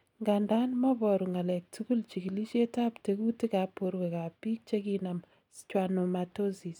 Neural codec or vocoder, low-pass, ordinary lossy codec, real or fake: none; 19.8 kHz; none; real